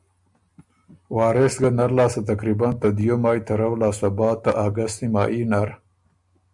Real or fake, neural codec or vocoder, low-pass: real; none; 10.8 kHz